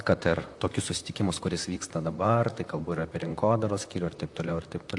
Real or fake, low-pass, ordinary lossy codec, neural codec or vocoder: fake; 10.8 kHz; AAC, 64 kbps; vocoder, 44.1 kHz, 128 mel bands, Pupu-Vocoder